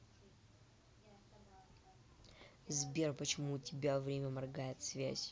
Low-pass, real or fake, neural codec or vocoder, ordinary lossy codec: none; real; none; none